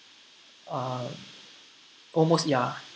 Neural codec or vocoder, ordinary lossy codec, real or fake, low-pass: none; none; real; none